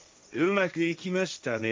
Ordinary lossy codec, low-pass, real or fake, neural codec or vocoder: none; none; fake; codec, 16 kHz, 1.1 kbps, Voila-Tokenizer